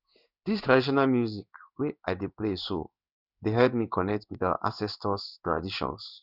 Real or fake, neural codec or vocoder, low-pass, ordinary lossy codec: fake; codec, 16 kHz in and 24 kHz out, 1 kbps, XY-Tokenizer; 5.4 kHz; none